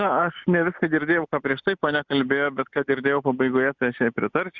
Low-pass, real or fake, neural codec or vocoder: 7.2 kHz; real; none